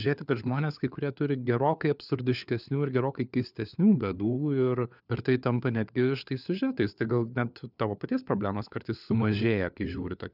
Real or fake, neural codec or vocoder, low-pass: fake; codec, 16 kHz, 4 kbps, FunCodec, trained on LibriTTS, 50 frames a second; 5.4 kHz